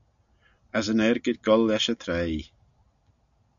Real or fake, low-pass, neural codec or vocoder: real; 7.2 kHz; none